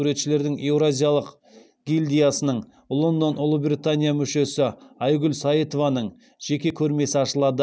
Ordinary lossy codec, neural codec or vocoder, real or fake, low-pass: none; none; real; none